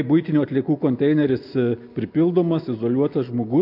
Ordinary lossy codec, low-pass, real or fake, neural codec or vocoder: MP3, 48 kbps; 5.4 kHz; real; none